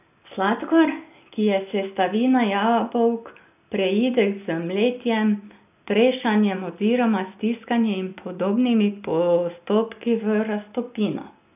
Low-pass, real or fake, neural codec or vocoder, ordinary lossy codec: 3.6 kHz; real; none; none